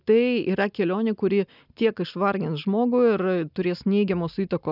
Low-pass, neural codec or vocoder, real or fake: 5.4 kHz; none; real